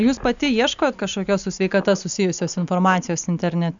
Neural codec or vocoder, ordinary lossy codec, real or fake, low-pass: none; MP3, 96 kbps; real; 7.2 kHz